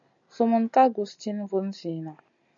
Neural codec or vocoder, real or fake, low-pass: none; real; 7.2 kHz